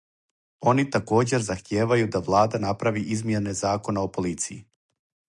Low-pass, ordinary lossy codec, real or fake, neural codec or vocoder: 10.8 kHz; MP3, 96 kbps; real; none